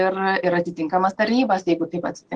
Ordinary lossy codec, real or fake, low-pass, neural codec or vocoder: Opus, 16 kbps; real; 9.9 kHz; none